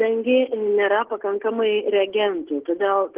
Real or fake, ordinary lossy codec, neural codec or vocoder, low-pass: real; Opus, 16 kbps; none; 3.6 kHz